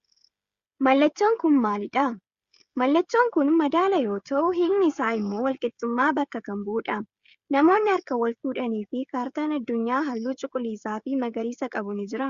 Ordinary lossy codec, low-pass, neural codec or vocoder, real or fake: Opus, 64 kbps; 7.2 kHz; codec, 16 kHz, 16 kbps, FreqCodec, smaller model; fake